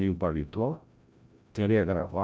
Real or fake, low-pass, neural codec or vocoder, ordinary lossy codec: fake; none; codec, 16 kHz, 0.5 kbps, FreqCodec, larger model; none